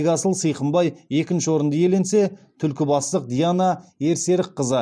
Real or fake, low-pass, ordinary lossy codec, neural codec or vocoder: real; none; none; none